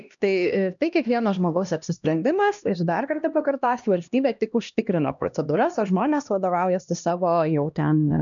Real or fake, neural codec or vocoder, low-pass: fake; codec, 16 kHz, 1 kbps, X-Codec, HuBERT features, trained on LibriSpeech; 7.2 kHz